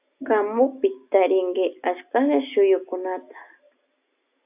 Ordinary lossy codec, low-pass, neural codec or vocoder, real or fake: AAC, 32 kbps; 3.6 kHz; none; real